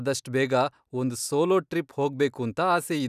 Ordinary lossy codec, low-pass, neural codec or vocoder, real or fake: none; 14.4 kHz; none; real